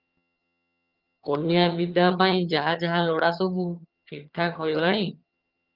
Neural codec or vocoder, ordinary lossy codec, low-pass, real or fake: vocoder, 22.05 kHz, 80 mel bands, HiFi-GAN; Opus, 24 kbps; 5.4 kHz; fake